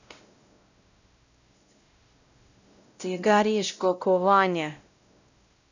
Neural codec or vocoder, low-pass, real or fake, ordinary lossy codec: codec, 16 kHz, 0.5 kbps, X-Codec, WavLM features, trained on Multilingual LibriSpeech; 7.2 kHz; fake; none